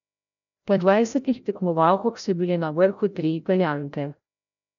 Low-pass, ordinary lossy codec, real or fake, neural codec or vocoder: 7.2 kHz; none; fake; codec, 16 kHz, 0.5 kbps, FreqCodec, larger model